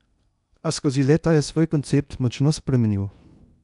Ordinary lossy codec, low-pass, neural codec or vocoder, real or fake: none; 10.8 kHz; codec, 16 kHz in and 24 kHz out, 0.8 kbps, FocalCodec, streaming, 65536 codes; fake